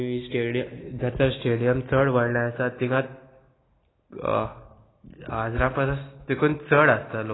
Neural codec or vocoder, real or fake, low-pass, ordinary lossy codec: none; real; 7.2 kHz; AAC, 16 kbps